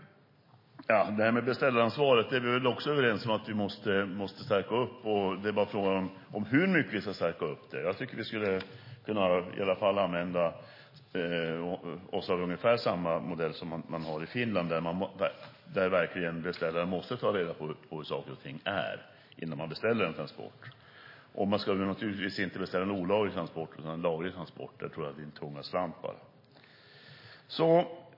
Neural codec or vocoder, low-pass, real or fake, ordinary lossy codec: none; 5.4 kHz; real; MP3, 24 kbps